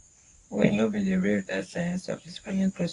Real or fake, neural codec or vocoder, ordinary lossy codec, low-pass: fake; codec, 24 kHz, 0.9 kbps, WavTokenizer, medium speech release version 1; AAC, 48 kbps; 10.8 kHz